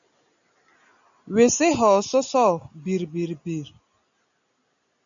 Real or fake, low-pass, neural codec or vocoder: real; 7.2 kHz; none